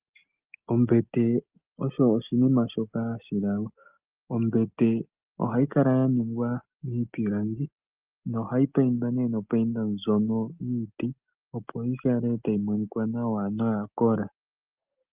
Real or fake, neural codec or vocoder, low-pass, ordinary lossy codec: real; none; 3.6 kHz; Opus, 24 kbps